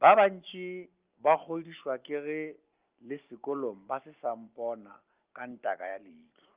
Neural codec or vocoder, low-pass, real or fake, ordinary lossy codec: none; 3.6 kHz; real; Opus, 24 kbps